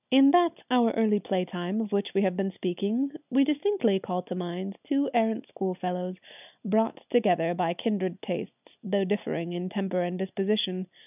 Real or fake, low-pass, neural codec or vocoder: real; 3.6 kHz; none